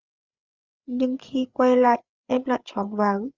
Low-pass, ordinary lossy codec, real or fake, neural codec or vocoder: 7.2 kHz; Opus, 32 kbps; fake; codec, 16 kHz, 8 kbps, FreqCodec, larger model